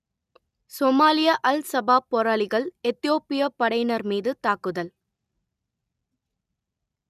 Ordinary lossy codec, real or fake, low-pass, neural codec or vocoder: none; real; 14.4 kHz; none